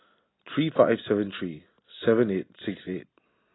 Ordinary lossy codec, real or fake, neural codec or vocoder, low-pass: AAC, 16 kbps; real; none; 7.2 kHz